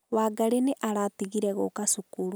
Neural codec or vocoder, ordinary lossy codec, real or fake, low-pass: none; none; real; none